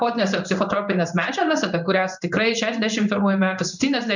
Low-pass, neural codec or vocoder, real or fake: 7.2 kHz; codec, 16 kHz in and 24 kHz out, 1 kbps, XY-Tokenizer; fake